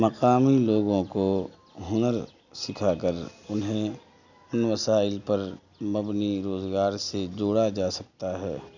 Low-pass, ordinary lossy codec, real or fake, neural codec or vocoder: 7.2 kHz; none; real; none